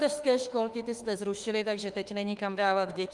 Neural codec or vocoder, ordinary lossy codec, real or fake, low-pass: autoencoder, 48 kHz, 32 numbers a frame, DAC-VAE, trained on Japanese speech; Opus, 24 kbps; fake; 10.8 kHz